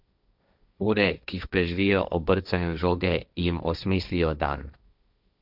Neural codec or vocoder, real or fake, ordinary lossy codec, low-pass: codec, 16 kHz, 1.1 kbps, Voila-Tokenizer; fake; none; 5.4 kHz